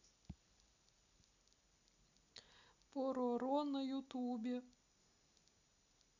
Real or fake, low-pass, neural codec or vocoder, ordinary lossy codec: real; 7.2 kHz; none; none